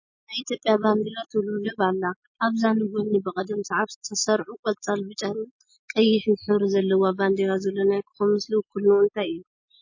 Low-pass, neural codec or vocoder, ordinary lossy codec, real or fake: 7.2 kHz; none; MP3, 32 kbps; real